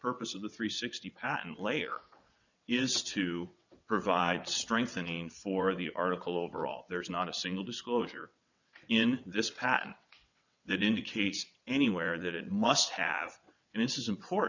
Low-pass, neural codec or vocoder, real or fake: 7.2 kHz; vocoder, 22.05 kHz, 80 mel bands, WaveNeXt; fake